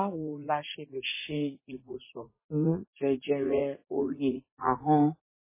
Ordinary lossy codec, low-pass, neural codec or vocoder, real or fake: MP3, 16 kbps; 3.6 kHz; vocoder, 44.1 kHz, 80 mel bands, Vocos; fake